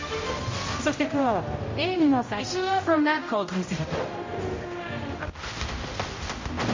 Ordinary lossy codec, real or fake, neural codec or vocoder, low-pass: MP3, 32 kbps; fake; codec, 16 kHz, 0.5 kbps, X-Codec, HuBERT features, trained on general audio; 7.2 kHz